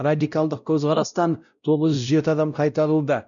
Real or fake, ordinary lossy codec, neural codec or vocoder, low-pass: fake; none; codec, 16 kHz, 0.5 kbps, X-Codec, WavLM features, trained on Multilingual LibriSpeech; 7.2 kHz